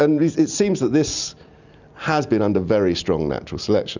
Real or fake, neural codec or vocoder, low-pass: real; none; 7.2 kHz